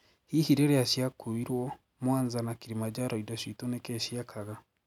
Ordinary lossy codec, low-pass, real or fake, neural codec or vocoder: none; 19.8 kHz; real; none